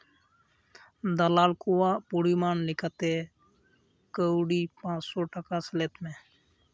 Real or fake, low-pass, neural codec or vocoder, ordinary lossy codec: real; none; none; none